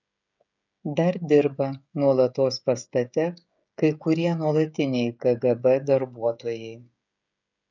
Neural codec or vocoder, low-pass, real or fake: codec, 16 kHz, 16 kbps, FreqCodec, smaller model; 7.2 kHz; fake